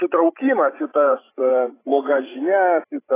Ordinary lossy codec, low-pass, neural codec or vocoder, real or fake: AAC, 16 kbps; 3.6 kHz; codec, 16 kHz, 16 kbps, FreqCodec, larger model; fake